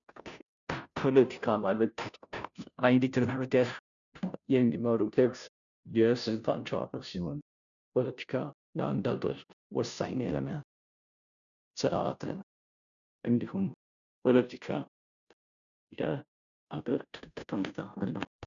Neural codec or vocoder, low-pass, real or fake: codec, 16 kHz, 0.5 kbps, FunCodec, trained on Chinese and English, 25 frames a second; 7.2 kHz; fake